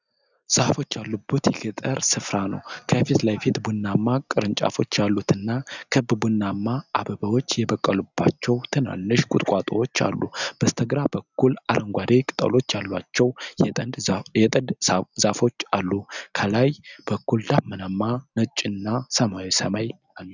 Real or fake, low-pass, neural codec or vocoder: real; 7.2 kHz; none